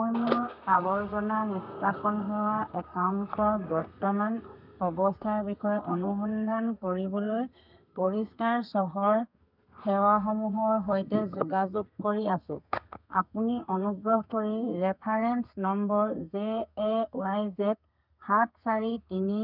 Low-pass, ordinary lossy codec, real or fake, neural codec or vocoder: 5.4 kHz; none; fake; codec, 32 kHz, 1.9 kbps, SNAC